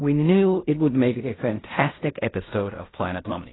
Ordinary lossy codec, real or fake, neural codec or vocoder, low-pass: AAC, 16 kbps; fake; codec, 16 kHz in and 24 kHz out, 0.4 kbps, LongCat-Audio-Codec, fine tuned four codebook decoder; 7.2 kHz